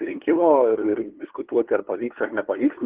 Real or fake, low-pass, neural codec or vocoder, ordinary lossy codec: fake; 3.6 kHz; codec, 16 kHz, 2 kbps, FunCodec, trained on LibriTTS, 25 frames a second; Opus, 16 kbps